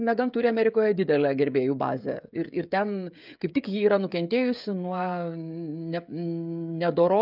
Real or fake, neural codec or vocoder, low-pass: fake; codec, 16 kHz, 16 kbps, FreqCodec, smaller model; 5.4 kHz